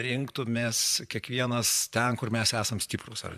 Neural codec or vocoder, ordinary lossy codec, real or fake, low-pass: vocoder, 44.1 kHz, 128 mel bands, Pupu-Vocoder; AAC, 96 kbps; fake; 14.4 kHz